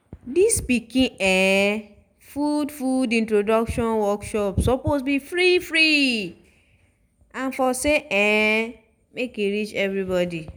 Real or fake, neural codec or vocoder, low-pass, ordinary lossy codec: real; none; none; none